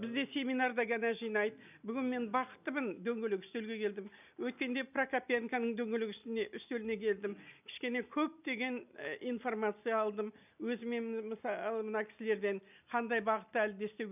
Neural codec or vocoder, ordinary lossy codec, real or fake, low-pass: none; none; real; 3.6 kHz